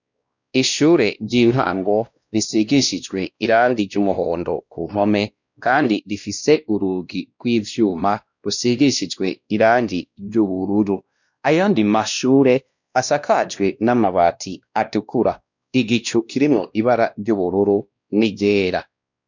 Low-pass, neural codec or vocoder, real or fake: 7.2 kHz; codec, 16 kHz, 1 kbps, X-Codec, WavLM features, trained on Multilingual LibriSpeech; fake